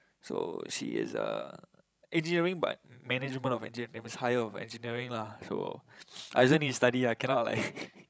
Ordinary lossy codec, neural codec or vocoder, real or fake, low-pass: none; codec, 16 kHz, 16 kbps, FreqCodec, larger model; fake; none